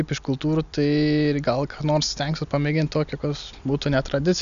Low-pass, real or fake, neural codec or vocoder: 7.2 kHz; real; none